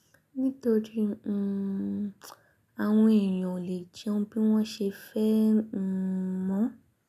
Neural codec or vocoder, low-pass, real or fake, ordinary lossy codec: none; 14.4 kHz; real; none